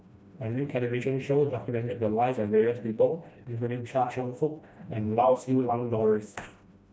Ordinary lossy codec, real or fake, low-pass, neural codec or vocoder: none; fake; none; codec, 16 kHz, 1 kbps, FreqCodec, smaller model